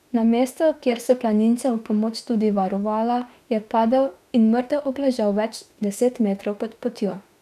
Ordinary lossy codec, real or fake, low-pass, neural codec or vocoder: none; fake; 14.4 kHz; autoencoder, 48 kHz, 32 numbers a frame, DAC-VAE, trained on Japanese speech